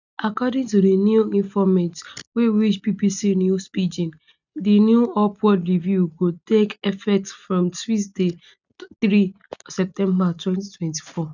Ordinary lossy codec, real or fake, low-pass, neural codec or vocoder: none; real; 7.2 kHz; none